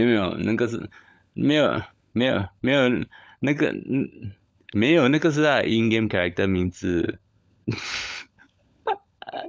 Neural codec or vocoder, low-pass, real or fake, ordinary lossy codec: codec, 16 kHz, 16 kbps, FunCodec, trained on LibriTTS, 50 frames a second; none; fake; none